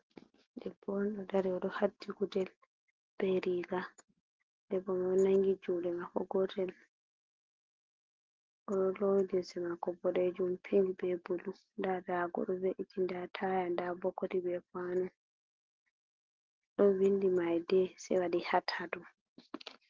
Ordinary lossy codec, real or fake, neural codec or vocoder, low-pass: Opus, 16 kbps; real; none; 7.2 kHz